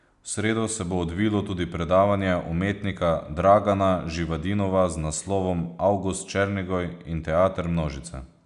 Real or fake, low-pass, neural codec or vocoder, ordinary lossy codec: real; 10.8 kHz; none; none